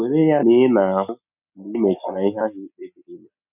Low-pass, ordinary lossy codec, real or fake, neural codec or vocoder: 3.6 kHz; none; real; none